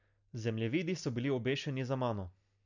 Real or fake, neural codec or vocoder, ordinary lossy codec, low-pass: real; none; none; 7.2 kHz